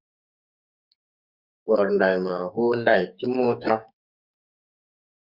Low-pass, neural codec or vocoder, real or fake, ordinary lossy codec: 5.4 kHz; codec, 44.1 kHz, 2.6 kbps, SNAC; fake; Opus, 64 kbps